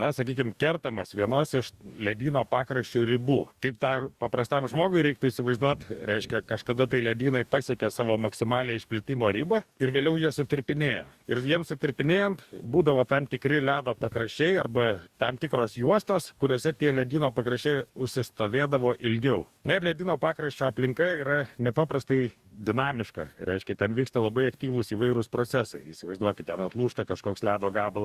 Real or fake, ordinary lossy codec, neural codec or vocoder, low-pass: fake; Opus, 64 kbps; codec, 44.1 kHz, 2.6 kbps, DAC; 14.4 kHz